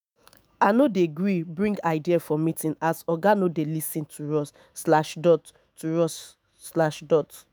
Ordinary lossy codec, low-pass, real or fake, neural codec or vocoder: none; none; fake; autoencoder, 48 kHz, 128 numbers a frame, DAC-VAE, trained on Japanese speech